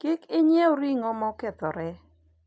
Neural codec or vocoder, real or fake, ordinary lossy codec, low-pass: none; real; none; none